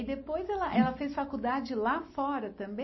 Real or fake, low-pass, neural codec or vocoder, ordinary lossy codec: real; 7.2 kHz; none; MP3, 24 kbps